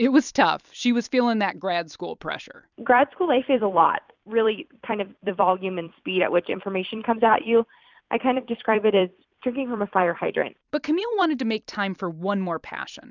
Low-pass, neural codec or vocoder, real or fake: 7.2 kHz; vocoder, 44.1 kHz, 128 mel bands every 256 samples, BigVGAN v2; fake